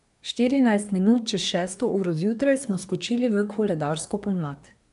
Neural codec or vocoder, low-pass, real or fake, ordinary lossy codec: codec, 24 kHz, 1 kbps, SNAC; 10.8 kHz; fake; none